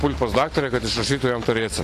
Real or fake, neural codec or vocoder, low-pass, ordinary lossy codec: real; none; 14.4 kHz; AAC, 48 kbps